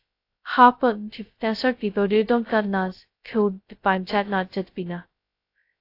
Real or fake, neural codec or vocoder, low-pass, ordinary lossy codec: fake; codec, 16 kHz, 0.2 kbps, FocalCodec; 5.4 kHz; AAC, 32 kbps